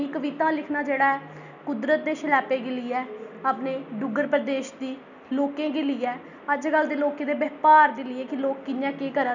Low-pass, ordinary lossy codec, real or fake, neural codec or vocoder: 7.2 kHz; none; real; none